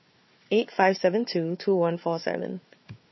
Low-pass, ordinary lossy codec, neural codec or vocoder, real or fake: 7.2 kHz; MP3, 24 kbps; codec, 16 kHz, 4 kbps, FunCodec, trained on Chinese and English, 50 frames a second; fake